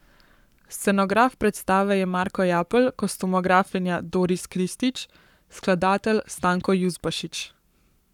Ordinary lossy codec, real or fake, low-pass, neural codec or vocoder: none; fake; 19.8 kHz; codec, 44.1 kHz, 7.8 kbps, Pupu-Codec